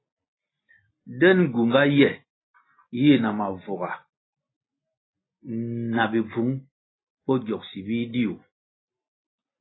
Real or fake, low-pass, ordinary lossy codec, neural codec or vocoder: real; 7.2 kHz; AAC, 16 kbps; none